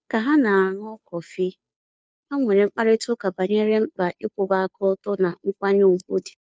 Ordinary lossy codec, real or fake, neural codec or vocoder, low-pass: none; fake; codec, 16 kHz, 2 kbps, FunCodec, trained on Chinese and English, 25 frames a second; none